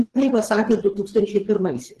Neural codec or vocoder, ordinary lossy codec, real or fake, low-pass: codec, 24 kHz, 3 kbps, HILCodec; Opus, 16 kbps; fake; 10.8 kHz